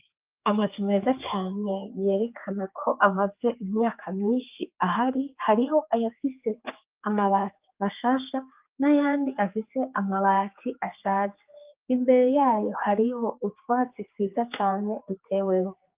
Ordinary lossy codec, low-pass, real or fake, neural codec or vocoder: Opus, 24 kbps; 3.6 kHz; fake; codec, 16 kHz, 4 kbps, X-Codec, HuBERT features, trained on general audio